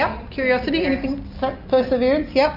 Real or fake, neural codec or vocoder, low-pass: real; none; 5.4 kHz